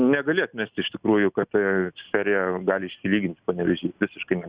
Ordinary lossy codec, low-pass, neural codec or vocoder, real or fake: Opus, 64 kbps; 3.6 kHz; none; real